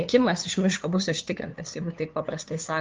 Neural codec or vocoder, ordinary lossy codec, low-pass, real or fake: codec, 16 kHz, 2 kbps, FunCodec, trained on LibriTTS, 25 frames a second; Opus, 32 kbps; 7.2 kHz; fake